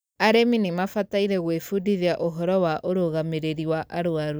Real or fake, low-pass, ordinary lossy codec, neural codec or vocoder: real; none; none; none